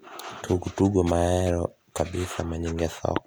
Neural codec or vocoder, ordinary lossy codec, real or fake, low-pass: none; none; real; none